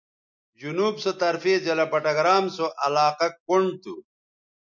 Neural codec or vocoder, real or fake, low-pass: none; real; 7.2 kHz